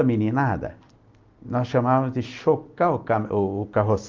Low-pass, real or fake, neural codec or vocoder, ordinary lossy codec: 7.2 kHz; real; none; Opus, 32 kbps